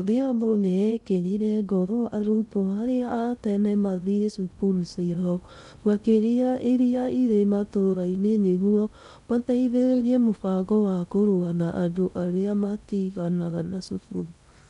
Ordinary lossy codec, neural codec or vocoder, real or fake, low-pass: none; codec, 16 kHz in and 24 kHz out, 0.6 kbps, FocalCodec, streaming, 2048 codes; fake; 10.8 kHz